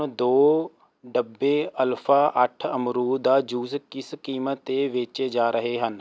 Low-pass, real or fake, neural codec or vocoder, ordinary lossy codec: none; real; none; none